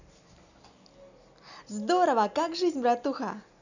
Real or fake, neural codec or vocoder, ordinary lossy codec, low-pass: real; none; none; 7.2 kHz